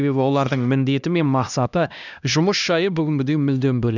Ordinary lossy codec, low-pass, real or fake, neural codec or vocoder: none; 7.2 kHz; fake; codec, 16 kHz, 1 kbps, X-Codec, HuBERT features, trained on LibriSpeech